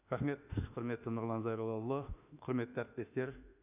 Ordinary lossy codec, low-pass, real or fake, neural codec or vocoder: none; 3.6 kHz; fake; autoencoder, 48 kHz, 32 numbers a frame, DAC-VAE, trained on Japanese speech